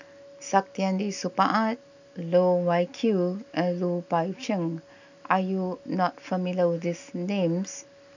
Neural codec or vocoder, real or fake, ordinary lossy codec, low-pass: none; real; none; 7.2 kHz